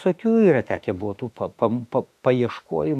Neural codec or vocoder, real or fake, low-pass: autoencoder, 48 kHz, 32 numbers a frame, DAC-VAE, trained on Japanese speech; fake; 14.4 kHz